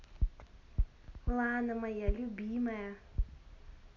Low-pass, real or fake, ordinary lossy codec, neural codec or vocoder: 7.2 kHz; real; none; none